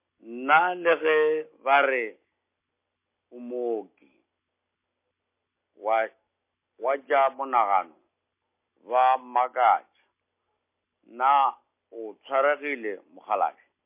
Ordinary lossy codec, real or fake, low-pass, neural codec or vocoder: MP3, 24 kbps; real; 3.6 kHz; none